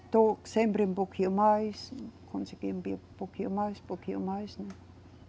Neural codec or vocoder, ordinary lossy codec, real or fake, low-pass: none; none; real; none